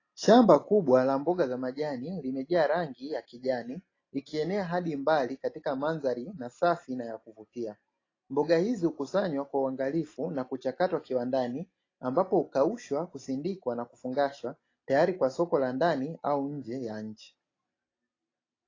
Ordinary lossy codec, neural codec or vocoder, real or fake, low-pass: AAC, 32 kbps; none; real; 7.2 kHz